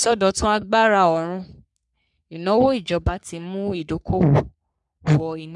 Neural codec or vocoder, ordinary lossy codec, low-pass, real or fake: codec, 44.1 kHz, 3.4 kbps, Pupu-Codec; none; 10.8 kHz; fake